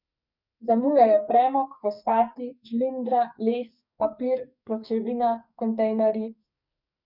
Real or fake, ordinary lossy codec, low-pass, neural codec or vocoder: fake; none; 5.4 kHz; codec, 44.1 kHz, 2.6 kbps, SNAC